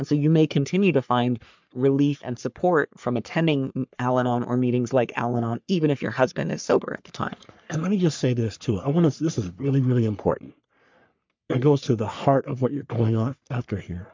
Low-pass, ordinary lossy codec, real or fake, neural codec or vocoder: 7.2 kHz; MP3, 64 kbps; fake; codec, 44.1 kHz, 3.4 kbps, Pupu-Codec